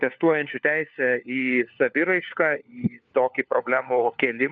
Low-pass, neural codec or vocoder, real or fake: 7.2 kHz; codec, 16 kHz, 4 kbps, FunCodec, trained on LibriTTS, 50 frames a second; fake